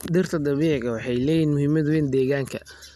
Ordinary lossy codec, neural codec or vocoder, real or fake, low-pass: none; none; real; 14.4 kHz